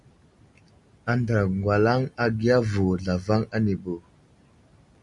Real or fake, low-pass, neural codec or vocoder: real; 10.8 kHz; none